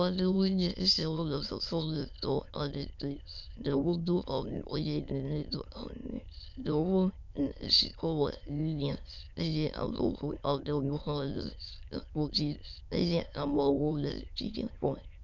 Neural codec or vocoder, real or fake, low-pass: autoencoder, 22.05 kHz, a latent of 192 numbers a frame, VITS, trained on many speakers; fake; 7.2 kHz